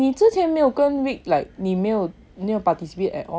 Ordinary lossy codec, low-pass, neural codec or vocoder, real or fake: none; none; none; real